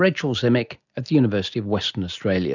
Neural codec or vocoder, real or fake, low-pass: none; real; 7.2 kHz